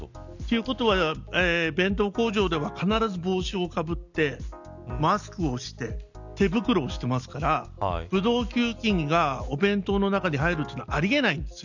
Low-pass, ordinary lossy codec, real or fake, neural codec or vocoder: 7.2 kHz; none; real; none